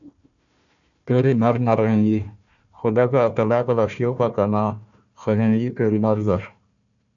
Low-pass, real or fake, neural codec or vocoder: 7.2 kHz; fake; codec, 16 kHz, 1 kbps, FunCodec, trained on Chinese and English, 50 frames a second